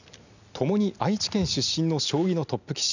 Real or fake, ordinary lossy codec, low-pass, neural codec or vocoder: real; none; 7.2 kHz; none